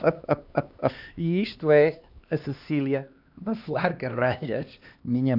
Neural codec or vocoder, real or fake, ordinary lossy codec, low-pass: codec, 16 kHz, 2 kbps, X-Codec, HuBERT features, trained on LibriSpeech; fake; AAC, 48 kbps; 5.4 kHz